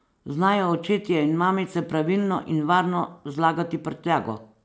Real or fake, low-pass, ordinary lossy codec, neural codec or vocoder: real; none; none; none